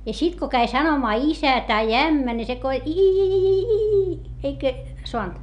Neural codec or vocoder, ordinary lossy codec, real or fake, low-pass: none; none; real; 10.8 kHz